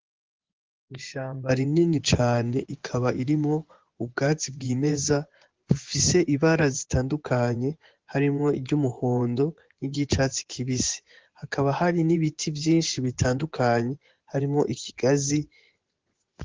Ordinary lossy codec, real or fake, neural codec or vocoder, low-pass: Opus, 16 kbps; fake; vocoder, 24 kHz, 100 mel bands, Vocos; 7.2 kHz